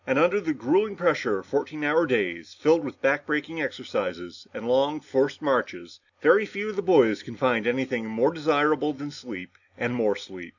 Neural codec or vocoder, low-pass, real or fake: none; 7.2 kHz; real